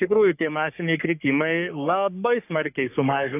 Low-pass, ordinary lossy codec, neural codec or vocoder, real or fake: 3.6 kHz; AAC, 32 kbps; codec, 44.1 kHz, 3.4 kbps, Pupu-Codec; fake